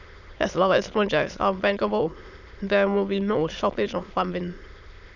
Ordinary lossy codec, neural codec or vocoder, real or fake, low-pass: none; autoencoder, 22.05 kHz, a latent of 192 numbers a frame, VITS, trained on many speakers; fake; 7.2 kHz